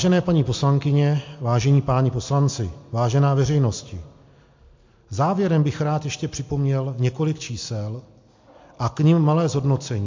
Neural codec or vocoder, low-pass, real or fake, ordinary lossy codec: none; 7.2 kHz; real; MP3, 48 kbps